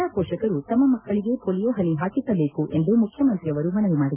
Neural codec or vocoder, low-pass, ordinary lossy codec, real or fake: none; 3.6 kHz; none; real